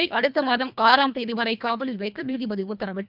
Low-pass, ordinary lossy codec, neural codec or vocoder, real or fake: 5.4 kHz; none; codec, 24 kHz, 1.5 kbps, HILCodec; fake